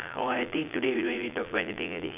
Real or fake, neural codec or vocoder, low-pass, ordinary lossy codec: fake; vocoder, 22.05 kHz, 80 mel bands, Vocos; 3.6 kHz; AAC, 32 kbps